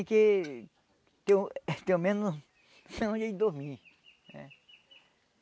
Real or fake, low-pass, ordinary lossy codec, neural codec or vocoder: real; none; none; none